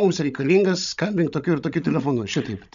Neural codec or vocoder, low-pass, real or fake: codec, 16 kHz, 16 kbps, FreqCodec, larger model; 7.2 kHz; fake